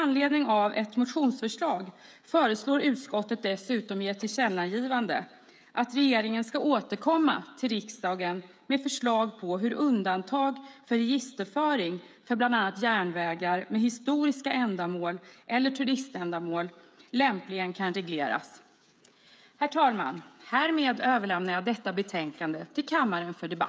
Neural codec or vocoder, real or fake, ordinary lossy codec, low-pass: codec, 16 kHz, 16 kbps, FreqCodec, smaller model; fake; none; none